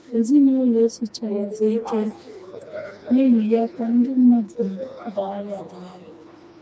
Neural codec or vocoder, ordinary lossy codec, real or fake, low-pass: codec, 16 kHz, 2 kbps, FreqCodec, smaller model; none; fake; none